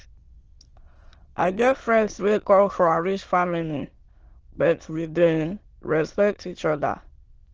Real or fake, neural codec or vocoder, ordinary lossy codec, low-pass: fake; autoencoder, 22.05 kHz, a latent of 192 numbers a frame, VITS, trained on many speakers; Opus, 16 kbps; 7.2 kHz